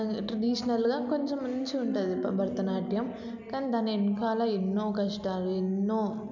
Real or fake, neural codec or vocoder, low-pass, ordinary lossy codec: real; none; 7.2 kHz; none